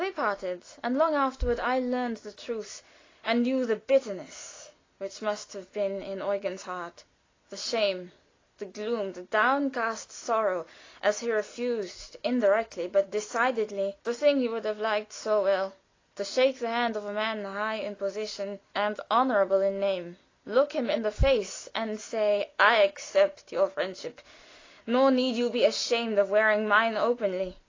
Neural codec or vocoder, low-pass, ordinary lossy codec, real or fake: none; 7.2 kHz; AAC, 32 kbps; real